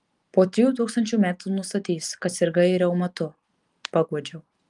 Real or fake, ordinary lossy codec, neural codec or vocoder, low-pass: real; Opus, 32 kbps; none; 10.8 kHz